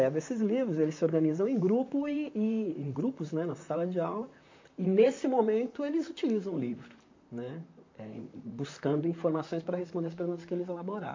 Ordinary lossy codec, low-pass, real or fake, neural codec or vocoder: MP3, 48 kbps; 7.2 kHz; fake; vocoder, 44.1 kHz, 128 mel bands, Pupu-Vocoder